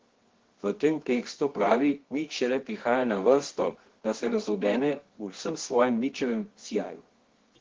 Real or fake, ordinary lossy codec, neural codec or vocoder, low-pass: fake; Opus, 16 kbps; codec, 24 kHz, 0.9 kbps, WavTokenizer, medium music audio release; 7.2 kHz